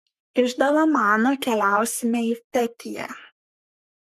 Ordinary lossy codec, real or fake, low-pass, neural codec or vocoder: MP3, 96 kbps; fake; 14.4 kHz; codec, 44.1 kHz, 3.4 kbps, Pupu-Codec